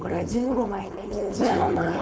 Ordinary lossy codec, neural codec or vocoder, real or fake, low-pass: none; codec, 16 kHz, 4.8 kbps, FACodec; fake; none